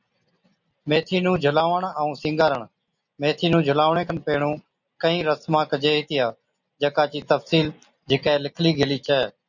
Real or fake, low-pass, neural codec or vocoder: real; 7.2 kHz; none